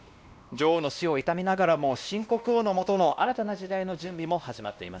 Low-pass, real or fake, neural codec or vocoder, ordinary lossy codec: none; fake; codec, 16 kHz, 1 kbps, X-Codec, WavLM features, trained on Multilingual LibriSpeech; none